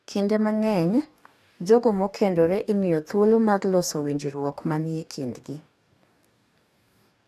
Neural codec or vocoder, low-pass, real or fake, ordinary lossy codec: codec, 44.1 kHz, 2.6 kbps, DAC; 14.4 kHz; fake; none